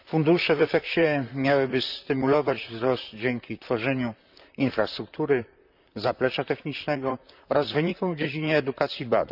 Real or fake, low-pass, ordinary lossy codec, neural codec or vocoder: fake; 5.4 kHz; none; vocoder, 44.1 kHz, 128 mel bands, Pupu-Vocoder